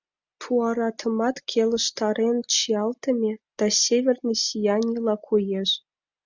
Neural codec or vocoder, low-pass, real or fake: none; 7.2 kHz; real